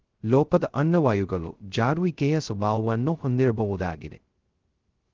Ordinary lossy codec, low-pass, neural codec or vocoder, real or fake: Opus, 16 kbps; 7.2 kHz; codec, 16 kHz, 0.2 kbps, FocalCodec; fake